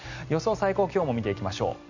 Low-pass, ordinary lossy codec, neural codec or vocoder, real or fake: 7.2 kHz; none; none; real